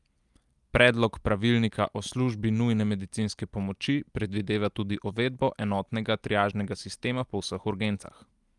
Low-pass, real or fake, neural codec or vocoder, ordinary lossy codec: 10.8 kHz; real; none; Opus, 32 kbps